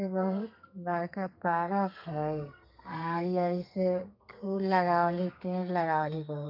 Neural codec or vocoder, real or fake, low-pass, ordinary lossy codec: codec, 32 kHz, 1.9 kbps, SNAC; fake; 5.4 kHz; MP3, 48 kbps